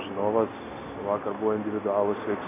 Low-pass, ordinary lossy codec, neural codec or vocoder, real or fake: 3.6 kHz; AAC, 24 kbps; none; real